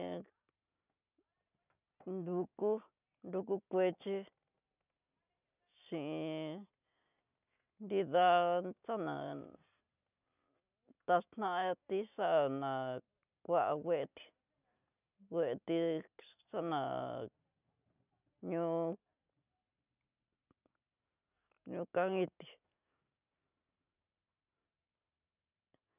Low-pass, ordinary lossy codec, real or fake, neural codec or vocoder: 3.6 kHz; none; real; none